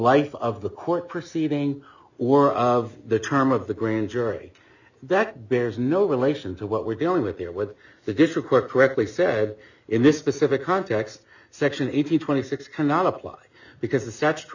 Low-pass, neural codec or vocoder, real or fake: 7.2 kHz; none; real